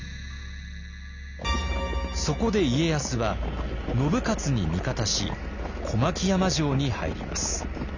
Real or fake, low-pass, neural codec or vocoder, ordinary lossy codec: real; 7.2 kHz; none; none